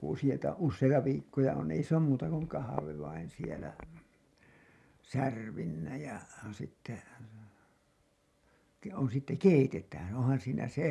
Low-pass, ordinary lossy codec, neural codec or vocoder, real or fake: none; none; none; real